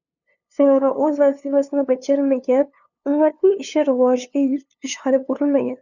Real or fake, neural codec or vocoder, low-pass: fake; codec, 16 kHz, 2 kbps, FunCodec, trained on LibriTTS, 25 frames a second; 7.2 kHz